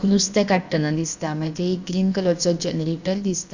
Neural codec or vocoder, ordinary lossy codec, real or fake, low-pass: codec, 16 kHz, about 1 kbps, DyCAST, with the encoder's durations; Opus, 64 kbps; fake; 7.2 kHz